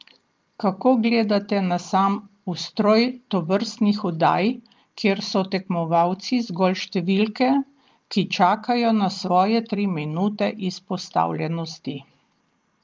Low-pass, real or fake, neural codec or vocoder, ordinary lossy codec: 7.2 kHz; real; none; Opus, 32 kbps